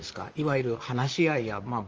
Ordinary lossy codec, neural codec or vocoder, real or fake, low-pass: Opus, 24 kbps; vocoder, 44.1 kHz, 128 mel bands, Pupu-Vocoder; fake; 7.2 kHz